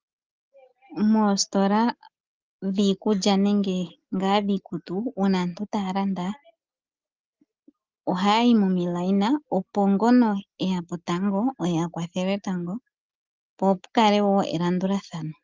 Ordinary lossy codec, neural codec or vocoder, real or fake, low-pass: Opus, 24 kbps; none; real; 7.2 kHz